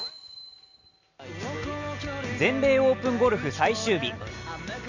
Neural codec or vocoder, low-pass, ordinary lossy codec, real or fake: none; 7.2 kHz; none; real